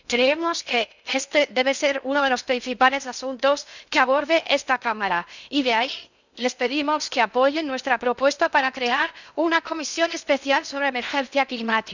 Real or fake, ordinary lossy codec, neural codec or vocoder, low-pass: fake; none; codec, 16 kHz in and 24 kHz out, 0.6 kbps, FocalCodec, streaming, 2048 codes; 7.2 kHz